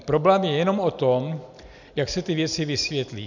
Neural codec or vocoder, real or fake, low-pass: none; real; 7.2 kHz